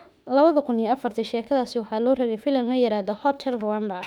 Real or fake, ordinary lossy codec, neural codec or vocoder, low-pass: fake; none; autoencoder, 48 kHz, 32 numbers a frame, DAC-VAE, trained on Japanese speech; 19.8 kHz